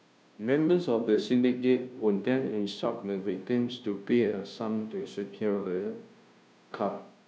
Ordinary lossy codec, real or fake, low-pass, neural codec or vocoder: none; fake; none; codec, 16 kHz, 0.5 kbps, FunCodec, trained on Chinese and English, 25 frames a second